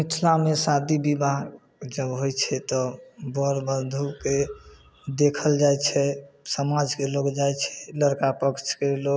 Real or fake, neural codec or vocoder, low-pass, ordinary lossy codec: real; none; none; none